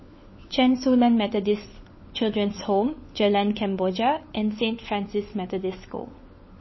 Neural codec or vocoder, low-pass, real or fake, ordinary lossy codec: codec, 16 kHz, 4 kbps, FunCodec, trained on LibriTTS, 50 frames a second; 7.2 kHz; fake; MP3, 24 kbps